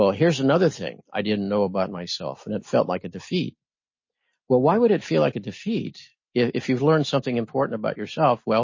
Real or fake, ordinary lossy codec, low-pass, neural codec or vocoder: real; MP3, 32 kbps; 7.2 kHz; none